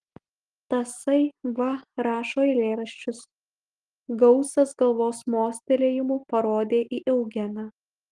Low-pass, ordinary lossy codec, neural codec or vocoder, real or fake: 10.8 kHz; Opus, 24 kbps; none; real